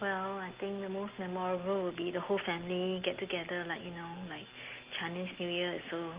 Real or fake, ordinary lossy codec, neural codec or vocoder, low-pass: real; Opus, 16 kbps; none; 3.6 kHz